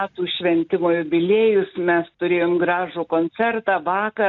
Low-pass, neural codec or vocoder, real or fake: 7.2 kHz; none; real